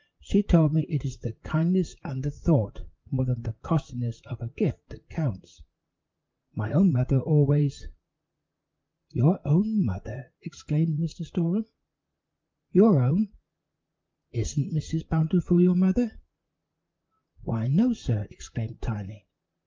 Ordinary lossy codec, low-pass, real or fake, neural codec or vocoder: Opus, 24 kbps; 7.2 kHz; fake; vocoder, 44.1 kHz, 128 mel bands, Pupu-Vocoder